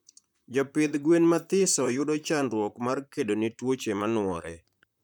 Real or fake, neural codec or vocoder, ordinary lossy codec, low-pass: fake; vocoder, 44.1 kHz, 128 mel bands, Pupu-Vocoder; none; 19.8 kHz